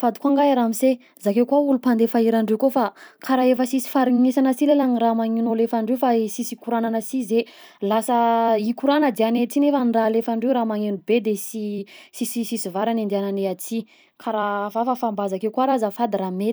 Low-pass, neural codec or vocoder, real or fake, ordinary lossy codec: none; vocoder, 44.1 kHz, 128 mel bands every 256 samples, BigVGAN v2; fake; none